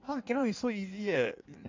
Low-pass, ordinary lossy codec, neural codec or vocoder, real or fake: 7.2 kHz; MP3, 48 kbps; codec, 16 kHz in and 24 kHz out, 1.1 kbps, FireRedTTS-2 codec; fake